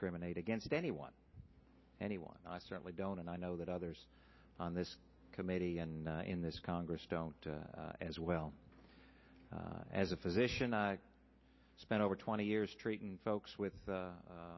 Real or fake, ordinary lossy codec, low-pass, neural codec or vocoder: real; MP3, 24 kbps; 7.2 kHz; none